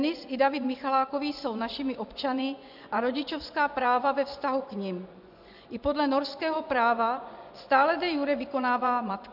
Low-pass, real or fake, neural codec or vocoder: 5.4 kHz; fake; vocoder, 24 kHz, 100 mel bands, Vocos